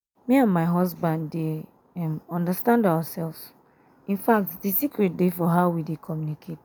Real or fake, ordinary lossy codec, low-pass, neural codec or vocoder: real; none; none; none